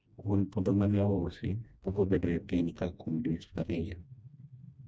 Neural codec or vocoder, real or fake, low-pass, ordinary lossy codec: codec, 16 kHz, 1 kbps, FreqCodec, smaller model; fake; none; none